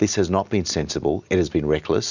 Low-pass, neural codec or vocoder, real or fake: 7.2 kHz; none; real